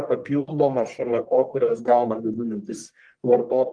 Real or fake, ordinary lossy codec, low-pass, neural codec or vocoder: fake; Opus, 24 kbps; 9.9 kHz; codec, 44.1 kHz, 1.7 kbps, Pupu-Codec